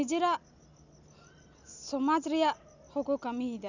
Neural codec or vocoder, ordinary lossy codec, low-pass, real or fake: none; none; 7.2 kHz; real